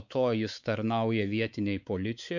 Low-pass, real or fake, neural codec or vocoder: 7.2 kHz; fake; codec, 16 kHz, 4 kbps, X-Codec, WavLM features, trained on Multilingual LibriSpeech